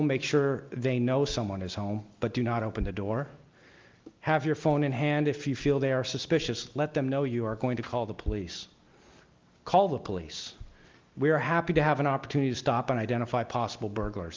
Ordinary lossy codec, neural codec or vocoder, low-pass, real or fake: Opus, 32 kbps; none; 7.2 kHz; real